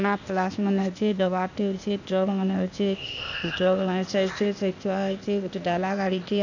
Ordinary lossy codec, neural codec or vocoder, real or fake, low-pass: none; codec, 16 kHz, 0.8 kbps, ZipCodec; fake; 7.2 kHz